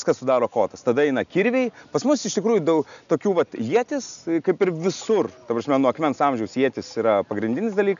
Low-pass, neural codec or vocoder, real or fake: 7.2 kHz; none; real